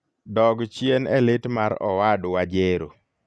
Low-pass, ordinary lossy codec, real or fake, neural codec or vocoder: none; none; real; none